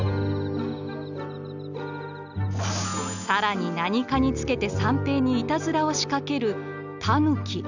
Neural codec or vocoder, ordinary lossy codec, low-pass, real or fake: none; none; 7.2 kHz; real